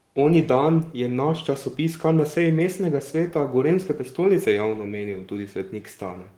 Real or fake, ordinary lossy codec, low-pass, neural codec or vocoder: fake; Opus, 24 kbps; 19.8 kHz; codec, 44.1 kHz, 7.8 kbps, Pupu-Codec